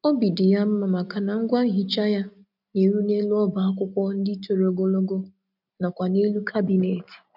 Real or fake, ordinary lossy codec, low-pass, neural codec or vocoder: real; none; 5.4 kHz; none